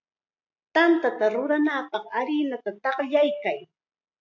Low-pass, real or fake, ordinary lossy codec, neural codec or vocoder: 7.2 kHz; real; AAC, 48 kbps; none